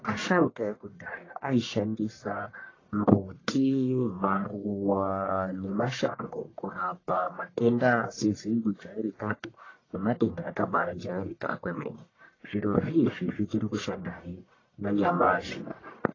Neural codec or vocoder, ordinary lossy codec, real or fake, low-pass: codec, 44.1 kHz, 1.7 kbps, Pupu-Codec; AAC, 32 kbps; fake; 7.2 kHz